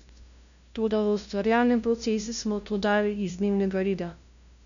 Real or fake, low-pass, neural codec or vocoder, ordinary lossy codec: fake; 7.2 kHz; codec, 16 kHz, 0.5 kbps, FunCodec, trained on LibriTTS, 25 frames a second; none